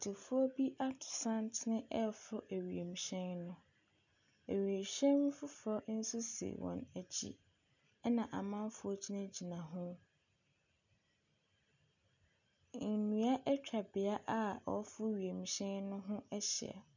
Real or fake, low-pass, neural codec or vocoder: real; 7.2 kHz; none